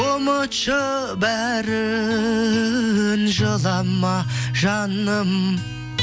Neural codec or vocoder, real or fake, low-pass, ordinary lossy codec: none; real; none; none